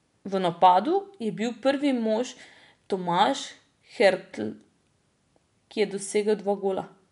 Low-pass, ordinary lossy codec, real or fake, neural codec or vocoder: 10.8 kHz; none; real; none